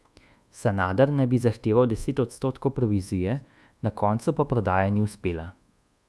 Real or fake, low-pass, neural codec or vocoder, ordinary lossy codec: fake; none; codec, 24 kHz, 1.2 kbps, DualCodec; none